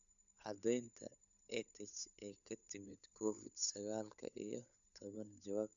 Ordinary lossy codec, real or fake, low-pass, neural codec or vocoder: none; fake; 7.2 kHz; codec, 16 kHz, 8 kbps, FunCodec, trained on Chinese and English, 25 frames a second